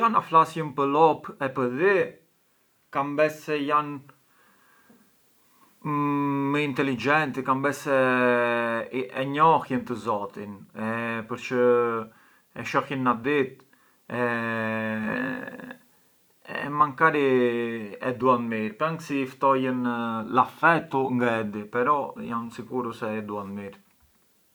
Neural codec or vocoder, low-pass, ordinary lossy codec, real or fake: none; none; none; real